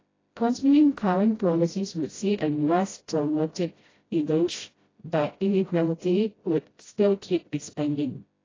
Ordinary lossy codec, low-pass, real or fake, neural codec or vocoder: AAC, 32 kbps; 7.2 kHz; fake; codec, 16 kHz, 0.5 kbps, FreqCodec, smaller model